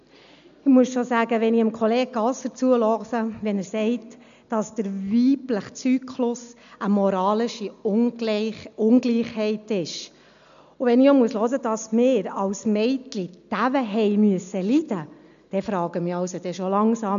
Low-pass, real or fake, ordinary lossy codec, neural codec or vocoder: 7.2 kHz; real; AAC, 96 kbps; none